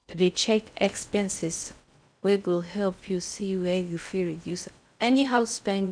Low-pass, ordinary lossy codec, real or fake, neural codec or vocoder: 9.9 kHz; none; fake; codec, 16 kHz in and 24 kHz out, 0.6 kbps, FocalCodec, streaming, 2048 codes